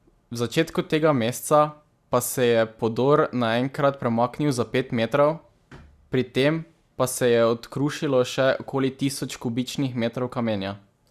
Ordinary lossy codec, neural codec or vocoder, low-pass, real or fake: Opus, 64 kbps; none; 14.4 kHz; real